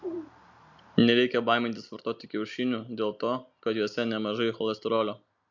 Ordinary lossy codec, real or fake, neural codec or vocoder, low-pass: MP3, 64 kbps; real; none; 7.2 kHz